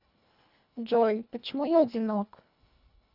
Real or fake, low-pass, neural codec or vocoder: fake; 5.4 kHz; codec, 24 kHz, 1.5 kbps, HILCodec